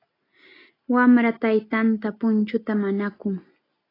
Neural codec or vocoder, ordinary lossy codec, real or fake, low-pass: none; AAC, 24 kbps; real; 5.4 kHz